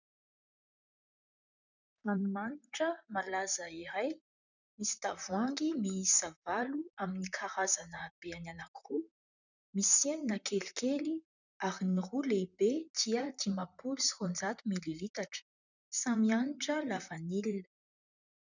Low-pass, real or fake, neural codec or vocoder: 7.2 kHz; fake; vocoder, 44.1 kHz, 128 mel bands, Pupu-Vocoder